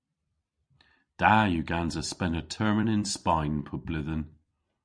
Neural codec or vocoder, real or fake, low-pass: vocoder, 44.1 kHz, 128 mel bands every 256 samples, BigVGAN v2; fake; 9.9 kHz